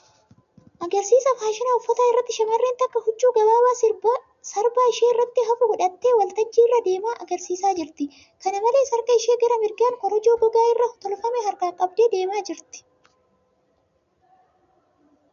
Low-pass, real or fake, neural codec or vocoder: 7.2 kHz; real; none